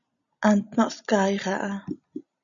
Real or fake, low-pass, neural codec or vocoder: real; 7.2 kHz; none